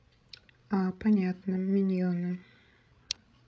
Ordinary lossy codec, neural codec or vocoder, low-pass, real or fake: none; codec, 16 kHz, 16 kbps, FreqCodec, larger model; none; fake